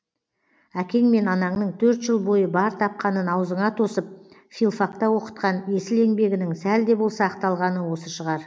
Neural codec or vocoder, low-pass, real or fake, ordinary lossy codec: none; none; real; none